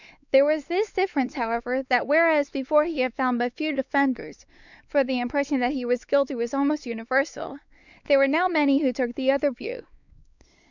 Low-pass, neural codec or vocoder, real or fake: 7.2 kHz; codec, 16 kHz, 4 kbps, X-Codec, WavLM features, trained on Multilingual LibriSpeech; fake